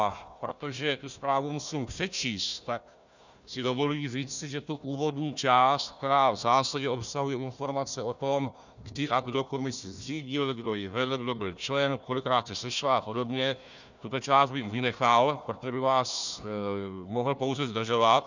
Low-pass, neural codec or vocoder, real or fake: 7.2 kHz; codec, 16 kHz, 1 kbps, FunCodec, trained on Chinese and English, 50 frames a second; fake